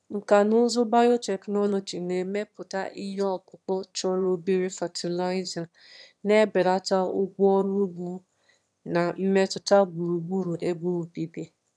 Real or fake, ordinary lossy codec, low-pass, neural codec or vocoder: fake; none; none; autoencoder, 22.05 kHz, a latent of 192 numbers a frame, VITS, trained on one speaker